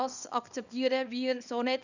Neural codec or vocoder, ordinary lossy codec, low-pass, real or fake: codec, 24 kHz, 0.9 kbps, WavTokenizer, small release; none; 7.2 kHz; fake